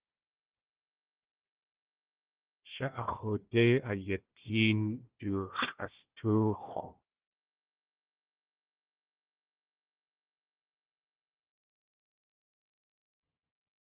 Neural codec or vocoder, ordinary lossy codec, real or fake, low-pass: codec, 16 kHz, 1 kbps, FunCodec, trained on Chinese and English, 50 frames a second; Opus, 16 kbps; fake; 3.6 kHz